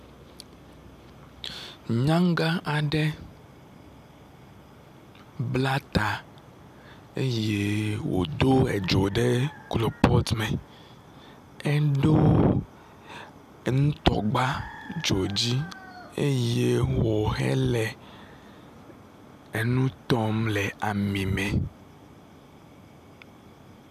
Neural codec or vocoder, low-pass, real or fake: none; 14.4 kHz; real